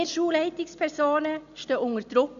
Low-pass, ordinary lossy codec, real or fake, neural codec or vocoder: 7.2 kHz; none; real; none